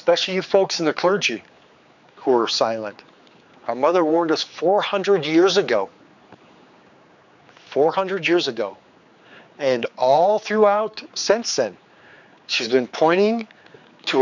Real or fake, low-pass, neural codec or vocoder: fake; 7.2 kHz; codec, 16 kHz, 4 kbps, X-Codec, HuBERT features, trained on general audio